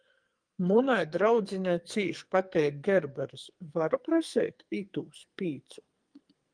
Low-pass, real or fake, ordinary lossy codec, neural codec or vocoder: 9.9 kHz; fake; Opus, 32 kbps; codec, 44.1 kHz, 2.6 kbps, SNAC